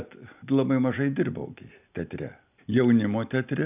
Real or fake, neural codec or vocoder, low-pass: real; none; 3.6 kHz